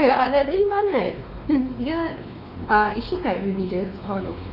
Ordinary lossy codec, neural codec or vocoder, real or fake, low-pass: none; codec, 16 kHz, 2 kbps, X-Codec, WavLM features, trained on Multilingual LibriSpeech; fake; 5.4 kHz